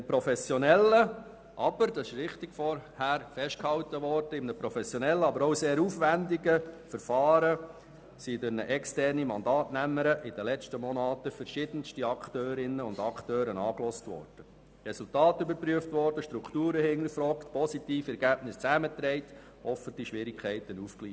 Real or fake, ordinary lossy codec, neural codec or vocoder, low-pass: real; none; none; none